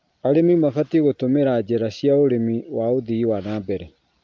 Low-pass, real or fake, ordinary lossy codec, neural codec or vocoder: 7.2 kHz; real; Opus, 32 kbps; none